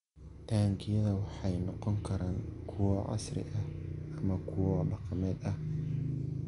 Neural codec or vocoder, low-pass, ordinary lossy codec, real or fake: none; 10.8 kHz; none; real